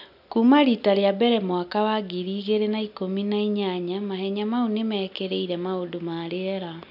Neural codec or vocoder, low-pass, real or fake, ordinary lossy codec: none; 5.4 kHz; real; none